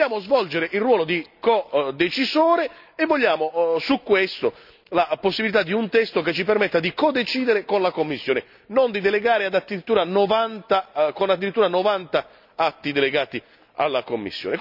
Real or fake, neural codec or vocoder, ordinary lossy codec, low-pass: real; none; none; 5.4 kHz